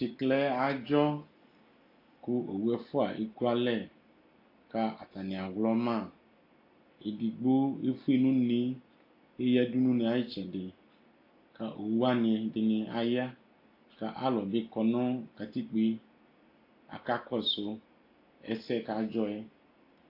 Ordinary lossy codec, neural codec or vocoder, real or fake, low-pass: AAC, 32 kbps; none; real; 5.4 kHz